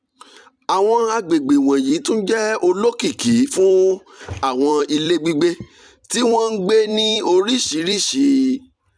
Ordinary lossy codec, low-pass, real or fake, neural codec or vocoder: none; 9.9 kHz; fake; vocoder, 44.1 kHz, 128 mel bands every 256 samples, BigVGAN v2